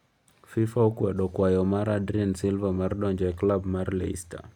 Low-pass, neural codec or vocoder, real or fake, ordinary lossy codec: 19.8 kHz; none; real; none